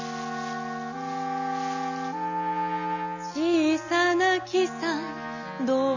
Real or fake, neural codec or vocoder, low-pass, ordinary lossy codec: real; none; 7.2 kHz; none